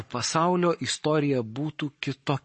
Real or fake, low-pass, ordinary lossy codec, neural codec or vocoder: fake; 10.8 kHz; MP3, 32 kbps; codec, 44.1 kHz, 7.8 kbps, Pupu-Codec